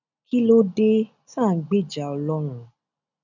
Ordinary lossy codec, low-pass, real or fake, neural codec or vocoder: none; 7.2 kHz; real; none